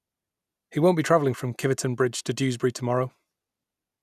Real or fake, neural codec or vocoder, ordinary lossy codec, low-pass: real; none; none; 14.4 kHz